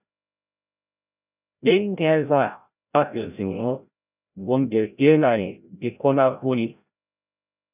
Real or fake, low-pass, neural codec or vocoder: fake; 3.6 kHz; codec, 16 kHz, 0.5 kbps, FreqCodec, larger model